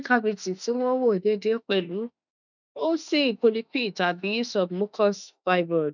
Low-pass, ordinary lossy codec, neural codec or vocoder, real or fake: 7.2 kHz; none; autoencoder, 48 kHz, 32 numbers a frame, DAC-VAE, trained on Japanese speech; fake